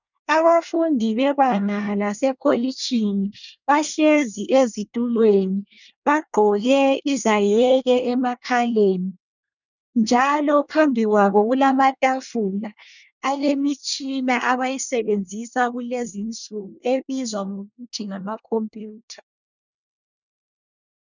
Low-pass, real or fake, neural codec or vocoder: 7.2 kHz; fake; codec, 24 kHz, 1 kbps, SNAC